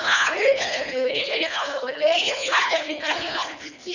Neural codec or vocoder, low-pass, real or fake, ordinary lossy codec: codec, 24 kHz, 1.5 kbps, HILCodec; 7.2 kHz; fake; none